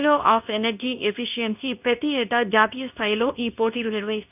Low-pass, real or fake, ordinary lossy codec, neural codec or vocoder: 3.6 kHz; fake; none; codec, 24 kHz, 0.9 kbps, WavTokenizer, medium speech release version 2